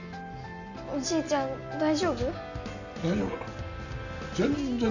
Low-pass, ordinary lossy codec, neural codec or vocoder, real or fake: 7.2 kHz; none; none; real